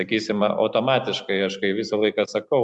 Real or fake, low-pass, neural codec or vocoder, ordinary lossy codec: real; 10.8 kHz; none; Opus, 64 kbps